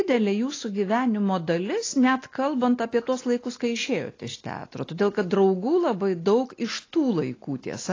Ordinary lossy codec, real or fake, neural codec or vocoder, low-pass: AAC, 32 kbps; real; none; 7.2 kHz